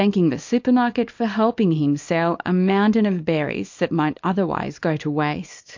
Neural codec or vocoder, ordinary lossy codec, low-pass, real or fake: codec, 24 kHz, 0.9 kbps, WavTokenizer, small release; MP3, 48 kbps; 7.2 kHz; fake